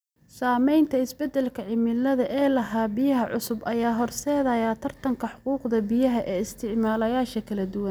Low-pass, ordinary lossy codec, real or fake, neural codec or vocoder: none; none; real; none